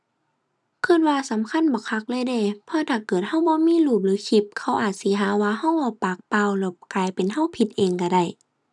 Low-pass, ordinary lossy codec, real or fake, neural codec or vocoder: none; none; real; none